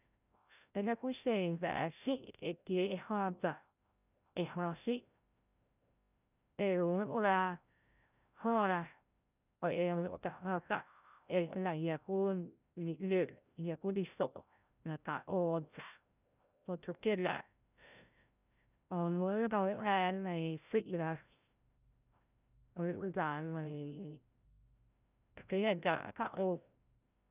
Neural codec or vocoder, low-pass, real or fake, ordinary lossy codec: codec, 16 kHz, 0.5 kbps, FreqCodec, larger model; 3.6 kHz; fake; none